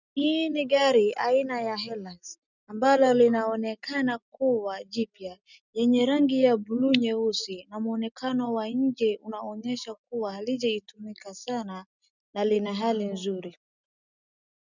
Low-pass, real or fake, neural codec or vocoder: 7.2 kHz; real; none